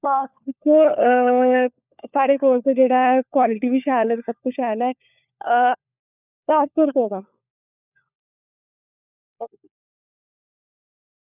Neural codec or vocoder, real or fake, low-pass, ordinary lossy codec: codec, 16 kHz, 4 kbps, FunCodec, trained on LibriTTS, 50 frames a second; fake; 3.6 kHz; none